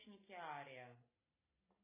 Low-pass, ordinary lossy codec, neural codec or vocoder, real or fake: 3.6 kHz; MP3, 16 kbps; none; real